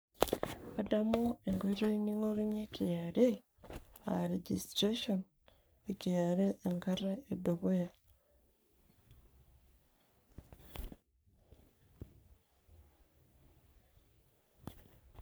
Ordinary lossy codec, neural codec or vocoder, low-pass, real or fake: none; codec, 44.1 kHz, 3.4 kbps, Pupu-Codec; none; fake